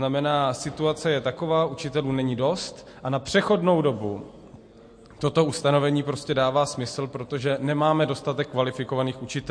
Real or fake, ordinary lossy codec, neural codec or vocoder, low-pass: real; MP3, 48 kbps; none; 9.9 kHz